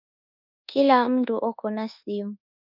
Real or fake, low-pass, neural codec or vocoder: fake; 5.4 kHz; codec, 24 kHz, 1.2 kbps, DualCodec